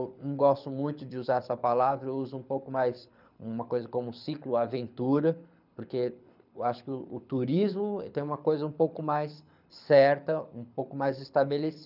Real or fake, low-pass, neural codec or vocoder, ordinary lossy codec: fake; 5.4 kHz; codec, 24 kHz, 6 kbps, HILCodec; none